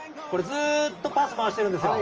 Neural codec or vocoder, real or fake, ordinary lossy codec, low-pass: none; real; Opus, 24 kbps; 7.2 kHz